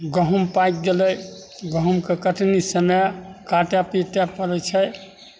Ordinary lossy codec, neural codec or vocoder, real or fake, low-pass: none; none; real; none